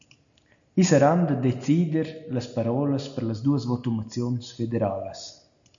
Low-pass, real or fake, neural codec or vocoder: 7.2 kHz; real; none